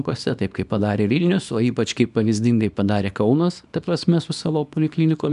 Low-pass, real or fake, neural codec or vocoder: 10.8 kHz; fake; codec, 24 kHz, 0.9 kbps, WavTokenizer, small release